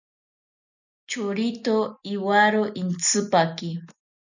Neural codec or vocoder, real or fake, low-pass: none; real; 7.2 kHz